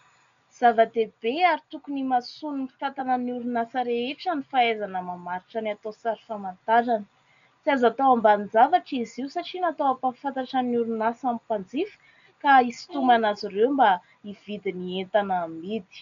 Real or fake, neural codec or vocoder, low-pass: real; none; 7.2 kHz